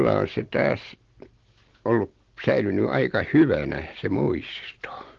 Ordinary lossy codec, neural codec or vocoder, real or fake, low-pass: Opus, 16 kbps; none; real; 7.2 kHz